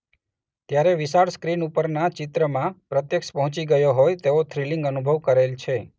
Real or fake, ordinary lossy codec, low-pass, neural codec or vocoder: real; none; none; none